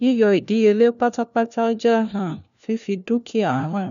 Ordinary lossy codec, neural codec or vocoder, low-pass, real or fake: none; codec, 16 kHz, 1 kbps, FunCodec, trained on LibriTTS, 50 frames a second; 7.2 kHz; fake